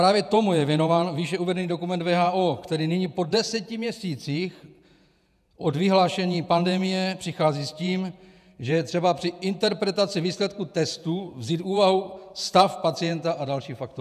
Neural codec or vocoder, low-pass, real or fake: vocoder, 48 kHz, 128 mel bands, Vocos; 14.4 kHz; fake